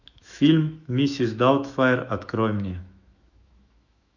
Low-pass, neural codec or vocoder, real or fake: 7.2 kHz; autoencoder, 48 kHz, 128 numbers a frame, DAC-VAE, trained on Japanese speech; fake